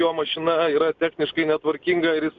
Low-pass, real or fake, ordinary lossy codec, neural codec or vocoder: 7.2 kHz; real; MP3, 96 kbps; none